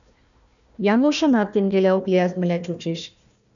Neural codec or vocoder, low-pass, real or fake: codec, 16 kHz, 1 kbps, FunCodec, trained on Chinese and English, 50 frames a second; 7.2 kHz; fake